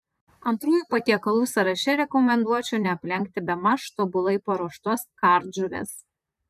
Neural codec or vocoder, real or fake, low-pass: vocoder, 44.1 kHz, 128 mel bands, Pupu-Vocoder; fake; 14.4 kHz